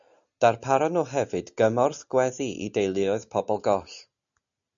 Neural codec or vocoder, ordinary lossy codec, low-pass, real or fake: none; MP3, 96 kbps; 7.2 kHz; real